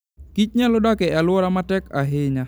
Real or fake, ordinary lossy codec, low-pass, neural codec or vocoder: real; none; none; none